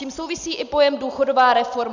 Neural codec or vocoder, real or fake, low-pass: none; real; 7.2 kHz